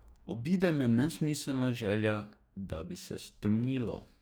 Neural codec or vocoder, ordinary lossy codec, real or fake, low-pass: codec, 44.1 kHz, 2.6 kbps, DAC; none; fake; none